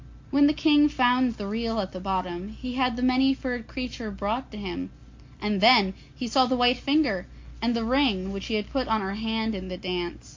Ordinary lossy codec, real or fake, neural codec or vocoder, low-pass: MP3, 48 kbps; real; none; 7.2 kHz